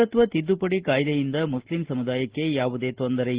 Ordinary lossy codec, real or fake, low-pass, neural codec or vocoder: Opus, 16 kbps; real; 3.6 kHz; none